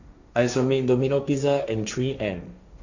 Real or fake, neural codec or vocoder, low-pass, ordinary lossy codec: fake; codec, 16 kHz, 1.1 kbps, Voila-Tokenizer; 7.2 kHz; none